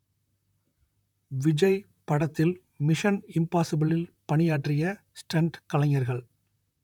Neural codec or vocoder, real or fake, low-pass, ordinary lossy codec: vocoder, 44.1 kHz, 128 mel bands, Pupu-Vocoder; fake; 19.8 kHz; none